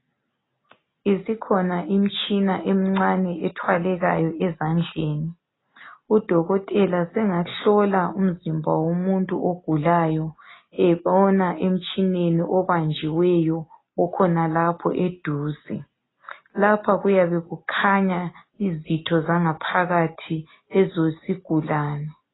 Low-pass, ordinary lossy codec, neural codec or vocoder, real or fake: 7.2 kHz; AAC, 16 kbps; none; real